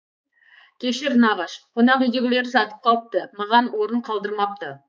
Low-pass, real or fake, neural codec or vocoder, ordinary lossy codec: none; fake; codec, 16 kHz, 4 kbps, X-Codec, HuBERT features, trained on balanced general audio; none